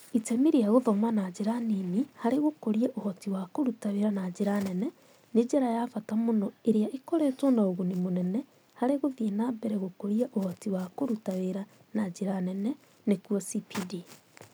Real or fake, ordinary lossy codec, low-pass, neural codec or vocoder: real; none; none; none